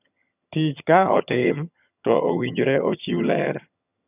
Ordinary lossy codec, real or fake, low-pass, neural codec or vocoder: none; fake; 3.6 kHz; vocoder, 22.05 kHz, 80 mel bands, HiFi-GAN